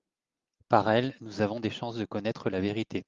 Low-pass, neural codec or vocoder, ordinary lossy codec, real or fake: 7.2 kHz; none; Opus, 32 kbps; real